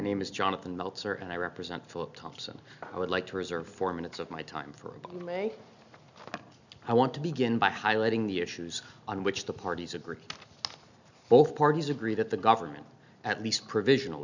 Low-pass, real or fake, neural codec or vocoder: 7.2 kHz; real; none